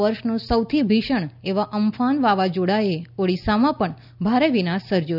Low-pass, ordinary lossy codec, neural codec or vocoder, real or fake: 5.4 kHz; none; none; real